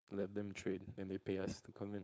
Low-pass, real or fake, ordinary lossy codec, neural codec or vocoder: none; fake; none; codec, 16 kHz, 4.8 kbps, FACodec